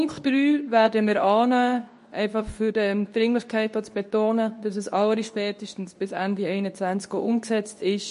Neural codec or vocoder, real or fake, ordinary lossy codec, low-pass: codec, 24 kHz, 0.9 kbps, WavTokenizer, medium speech release version 2; fake; MP3, 48 kbps; 10.8 kHz